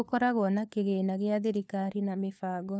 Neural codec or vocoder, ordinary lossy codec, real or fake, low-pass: codec, 16 kHz, 4 kbps, FunCodec, trained on LibriTTS, 50 frames a second; none; fake; none